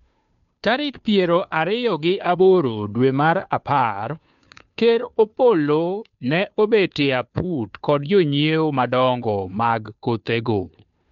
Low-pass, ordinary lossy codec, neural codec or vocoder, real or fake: 7.2 kHz; none; codec, 16 kHz, 2 kbps, FunCodec, trained on Chinese and English, 25 frames a second; fake